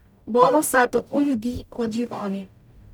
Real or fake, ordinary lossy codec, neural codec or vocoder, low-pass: fake; none; codec, 44.1 kHz, 0.9 kbps, DAC; 19.8 kHz